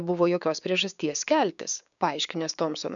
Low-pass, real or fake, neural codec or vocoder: 7.2 kHz; fake; codec, 16 kHz, 6 kbps, DAC